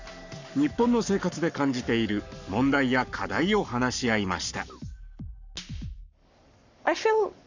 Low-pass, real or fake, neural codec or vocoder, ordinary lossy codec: 7.2 kHz; fake; codec, 44.1 kHz, 7.8 kbps, Pupu-Codec; none